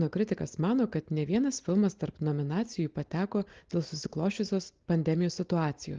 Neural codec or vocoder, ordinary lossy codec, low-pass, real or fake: none; Opus, 16 kbps; 7.2 kHz; real